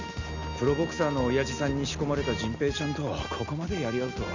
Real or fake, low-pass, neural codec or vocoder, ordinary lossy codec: real; 7.2 kHz; none; none